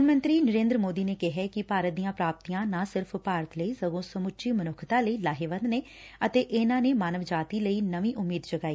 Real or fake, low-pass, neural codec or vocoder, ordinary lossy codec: real; none; none; none